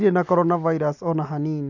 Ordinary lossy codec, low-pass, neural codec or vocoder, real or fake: none; 7.2 kHz; none; real